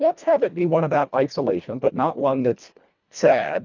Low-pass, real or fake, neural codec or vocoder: 7.2 kHz; fake; codec, 24 kHz, 1.5 kbps, HILCodec